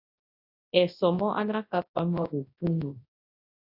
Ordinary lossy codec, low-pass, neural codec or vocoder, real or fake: AAC, 32 kbps; 5.4 kHz; codec, 24 kHz, 0.9 kbps, WavTokenizer, large speech release; fake